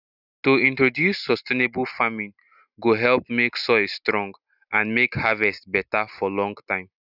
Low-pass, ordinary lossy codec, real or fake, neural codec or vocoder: 5.4 kHz; none; real; none